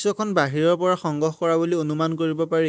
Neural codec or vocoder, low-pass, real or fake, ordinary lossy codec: none; none; real; none